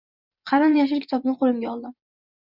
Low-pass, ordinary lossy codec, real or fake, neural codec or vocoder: 5.4 kHz; Opus, 64 kbps; fake; vocoder, 24 kHz, 100 mel bands, Vocos